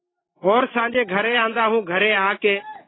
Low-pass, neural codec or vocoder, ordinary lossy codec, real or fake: 7.2 kHz; none; AAC, 16 kbps; real